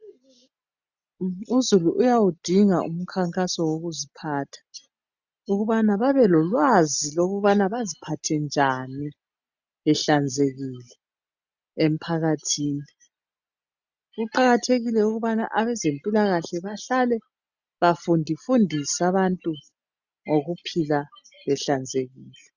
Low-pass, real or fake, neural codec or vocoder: 7.2 kHz; real; none